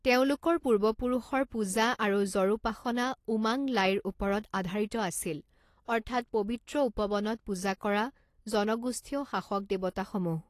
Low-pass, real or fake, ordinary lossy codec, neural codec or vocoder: 14.4 kHz; real; AAC, 48 kbps; none